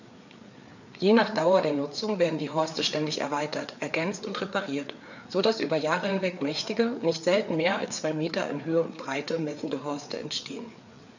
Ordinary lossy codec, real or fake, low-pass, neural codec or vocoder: none; fake; 7.2 kHz; codec, 16 kHz, 4 kbps, FreqCodec, larger model